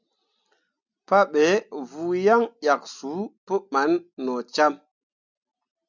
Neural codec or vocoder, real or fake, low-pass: none; real; 7.2 kHz